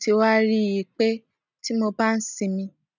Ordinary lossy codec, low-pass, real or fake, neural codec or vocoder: none; 7.2 kHz; real; none